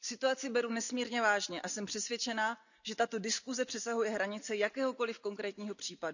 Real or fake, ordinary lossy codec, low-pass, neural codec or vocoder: real; none; 7.2 kHz; none